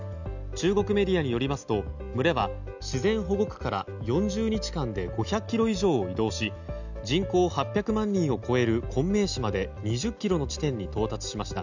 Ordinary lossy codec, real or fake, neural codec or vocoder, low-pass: none; real; none; 7.2 kHz